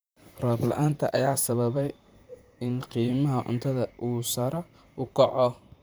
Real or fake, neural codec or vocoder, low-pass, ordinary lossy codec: fake; vocoder, 44.1 kHz, 128 mel bands, Pupu-Vocoder; none; none